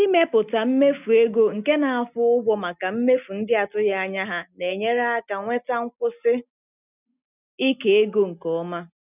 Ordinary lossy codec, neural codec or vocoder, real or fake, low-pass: none; none; real; 3.6 kHz